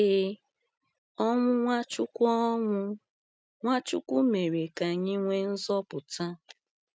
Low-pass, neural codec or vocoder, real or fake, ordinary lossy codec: none; none; real; none